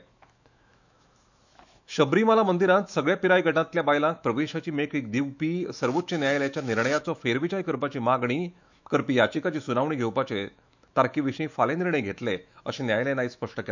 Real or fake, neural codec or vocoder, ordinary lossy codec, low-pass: fake; autoencoder, 48 kHz, 128 numbers a frame, DAC-VAE, trained on Japanese speech; none; 7.2 kHz